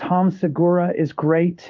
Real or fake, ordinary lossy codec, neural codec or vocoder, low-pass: fake; Opus, 24 kbps; codec, 16 kHz in and 24 kHz out, 1 kbps, XY-Tokenizer; 7.2 kHz